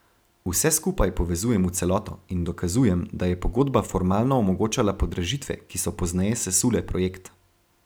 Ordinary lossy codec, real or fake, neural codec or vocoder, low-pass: none; real; none; none